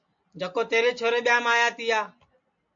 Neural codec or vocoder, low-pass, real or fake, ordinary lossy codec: none; 7.2 kHz; real; AAC, 64 kbps